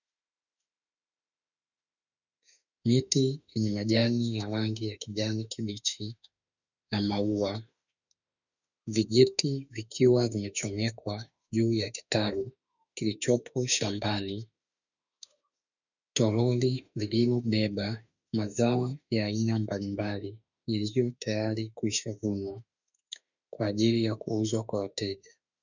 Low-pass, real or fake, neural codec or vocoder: 7.2 kHz; fake; autoencoder, 48 kHz, 32 numbers a frame, DAC-VAE, trained on Japanese speech